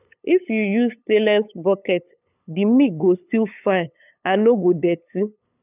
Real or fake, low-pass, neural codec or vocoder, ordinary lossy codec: fake; 3.6 kHz; codec, 16 kHz, 8 kbps, FunCodec, trained on LibriTTS, 25 frames a second; none